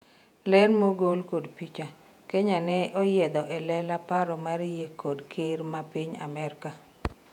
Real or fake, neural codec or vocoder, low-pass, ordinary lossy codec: fake; vocoder, 48 kHz, 128 mel bands, Vocos; 19.8 kHz; none